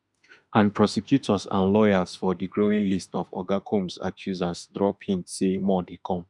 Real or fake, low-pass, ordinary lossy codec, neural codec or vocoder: fake; 10.8 kHz; none; autoencoder, 48 kHz, 32 numbers a frame, DAC-VAE, trained on Japanese speech